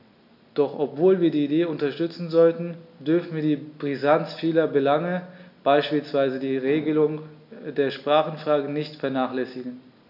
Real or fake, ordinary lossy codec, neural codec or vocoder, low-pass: real; none; none; 5.4 kHz